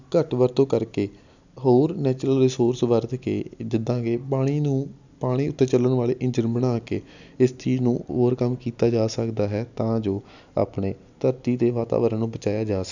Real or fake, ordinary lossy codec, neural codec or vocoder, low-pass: real; none; none; 7.2 kHz